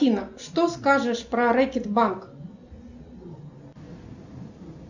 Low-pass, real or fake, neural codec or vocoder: 7.2 kHz; real; none